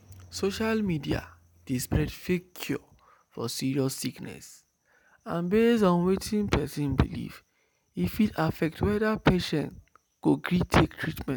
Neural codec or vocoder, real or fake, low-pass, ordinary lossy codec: none; real; none; none